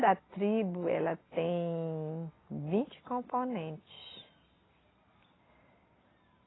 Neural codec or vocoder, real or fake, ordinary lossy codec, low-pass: none; real; AAC, 16 kbps; 7.2 kHz